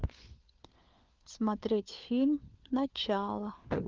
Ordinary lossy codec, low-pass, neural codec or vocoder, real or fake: Opus, 32 kbps; 7.2 kHz; codec, 16 kHz, 4 kbps, FunCodec, trained on LibriTTS, 50 frames a second; fake